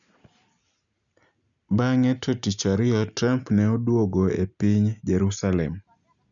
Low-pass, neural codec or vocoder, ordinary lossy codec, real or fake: 7.2 kHz; none; none; real